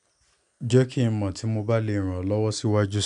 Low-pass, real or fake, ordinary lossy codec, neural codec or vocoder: 10.8 kHz; real; none; none